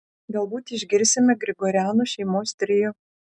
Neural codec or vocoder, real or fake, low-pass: none; real; 10.8 kHz